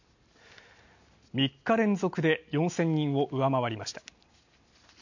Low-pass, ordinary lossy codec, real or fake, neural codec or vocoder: 7.2 kHz; none; real; none